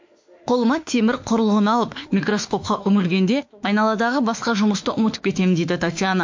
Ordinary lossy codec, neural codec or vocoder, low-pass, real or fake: MP3, 48 kbps; autoencoder, 48 kHz, 32 numbers a frame, DAC-VAE, trained on Japanese speech; 7.2 kHz; fake